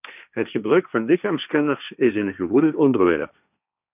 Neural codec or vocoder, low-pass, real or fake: codec, 16 kHz, 1.1 kbps, Voila-Tokenizer; 3.6 kHz; fake